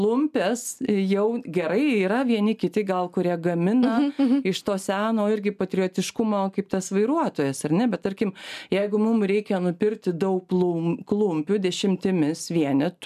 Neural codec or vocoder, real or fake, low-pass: none; real; 14.4 kHz